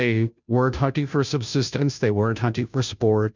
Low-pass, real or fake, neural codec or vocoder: 7.2 kHz; fake; codec, 16 kHz, 0.5 kbps, FunCodec, trained on Chinese and English, 25 frames a second